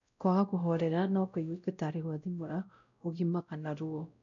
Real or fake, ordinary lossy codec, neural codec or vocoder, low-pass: fake; none; codec, 16 kHz, 0.5 kbps, X-Codec, WavLM features, trained on Multilingual LibriSpeech; 7.2 kHz